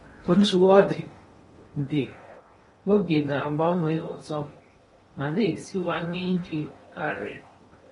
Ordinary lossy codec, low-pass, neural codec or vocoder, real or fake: AAC, 32 kbps; 10.8 kHz; codec, 16 kHz in and 24 kHz out, 0.8 kbps, FocalCodec, streaming, 65536 codes; fake